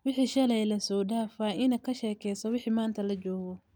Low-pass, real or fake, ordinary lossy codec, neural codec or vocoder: none; real; none; none